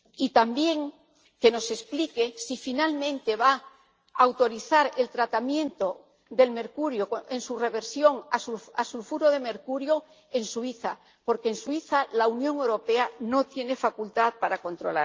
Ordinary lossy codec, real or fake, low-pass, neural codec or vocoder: Opus, 24 kbps; real; 7.2 kHz; none